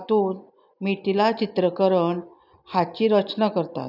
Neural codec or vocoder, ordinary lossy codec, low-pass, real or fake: none; none; 5.4 kHz; real